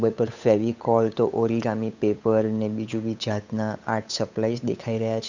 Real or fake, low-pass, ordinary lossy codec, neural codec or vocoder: fake; 7.2 kHz; none; codec, 16 kHz, 8 kbps, FunCodec, trained on Chinese and English, 25 frames a second